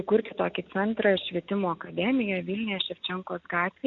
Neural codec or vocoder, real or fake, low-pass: none; real; 7.2 kHz